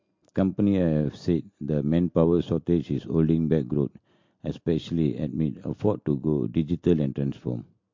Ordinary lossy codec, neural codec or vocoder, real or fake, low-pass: MP3, 48 kbps; none; real; 7.2 kHz